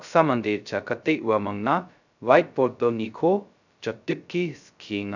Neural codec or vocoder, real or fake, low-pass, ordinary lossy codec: codec, 16 kHz, 0.2 kbps, FocalCodec; fake; 7.2 kHz; none